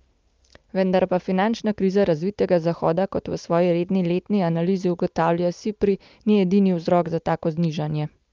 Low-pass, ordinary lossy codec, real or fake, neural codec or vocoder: 7.2 kHz; Opus, 24 kbps; real; none